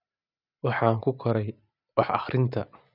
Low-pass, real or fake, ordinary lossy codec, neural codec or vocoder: 5.4 kHz; real; none; none